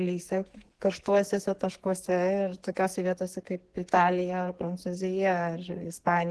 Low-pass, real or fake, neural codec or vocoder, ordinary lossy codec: 10.8 kHz; fake; codec, 44.1 kHz, 2.6 kbps, SNAC; Opus, 16 kbps